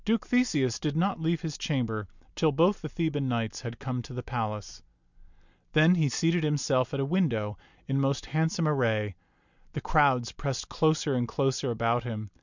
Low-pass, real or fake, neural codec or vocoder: 7.2 kHz; real; none